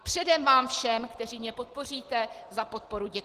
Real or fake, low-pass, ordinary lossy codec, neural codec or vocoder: real; 14.4 kHz; Opus, 16 kbps; none